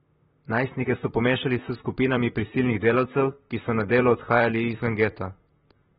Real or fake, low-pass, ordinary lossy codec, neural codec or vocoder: fake; 19.8 kHz; AAC, 16 kbps; vocoder, 44.1 kHz, 128 mel bands, Pupu-Vocoder